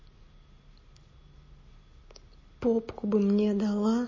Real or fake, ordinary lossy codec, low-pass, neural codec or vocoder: real; MP3, 32 kbps; 7.2 kHz; none